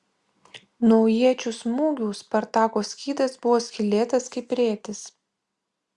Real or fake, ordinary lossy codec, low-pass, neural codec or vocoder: real; Opus, 64 kbps; 10.8 kHz; none